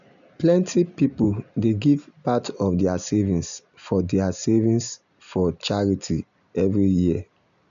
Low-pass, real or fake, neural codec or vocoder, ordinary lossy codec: 7.2 kHz; real; none; none